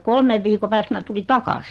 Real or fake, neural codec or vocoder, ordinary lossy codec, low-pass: fake; vocoder, 44.1 kHz, 128 mel bands every 512 samples, BigVGAN v2; Opus, 16 kbps; 14.4 kHz